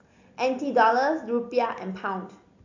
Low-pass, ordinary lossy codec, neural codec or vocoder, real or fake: 7.2 kHz; AAC, 48 kbps; none; real